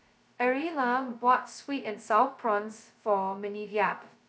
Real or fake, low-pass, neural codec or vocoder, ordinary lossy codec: fake; none; codec, 16 kHz, 0.2 kbps, FocalCodec; none